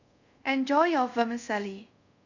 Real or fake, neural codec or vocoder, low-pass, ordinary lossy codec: fake; codec, 24 kHz, 0.5 kbps, DualCodec; 7.2 kHz; none